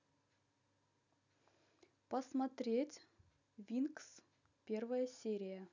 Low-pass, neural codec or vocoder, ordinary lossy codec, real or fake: 7.2 kHz; none; none; real